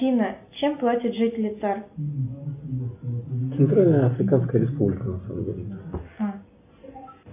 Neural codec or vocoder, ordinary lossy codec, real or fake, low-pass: none; AAC, 32 kbps; real; 3.6 kHz